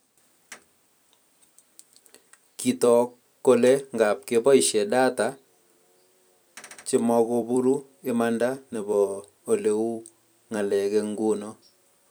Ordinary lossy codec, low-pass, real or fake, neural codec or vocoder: none; none; fake; vocoder, 44.1 kHz, 128 mel bands every 256 samples, BigVGAN v2